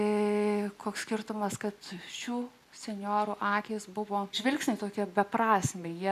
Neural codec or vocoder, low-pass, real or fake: none; 14.4 kHz; real